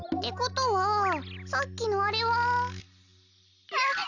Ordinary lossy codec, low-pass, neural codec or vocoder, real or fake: none; 7.2 kHz; none; real